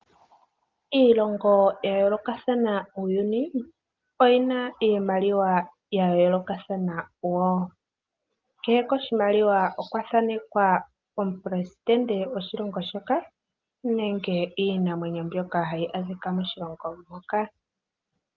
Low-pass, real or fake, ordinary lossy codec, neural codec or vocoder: 7.2 kHz; real; Opus, 32 kbps; none